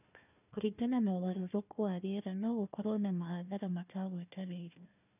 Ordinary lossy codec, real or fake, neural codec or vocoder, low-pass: none; fake; codec, 16 kHz, 1 kbps, FunCodec, trained on Chinese and English, 50 frames a second; 3.6 kHz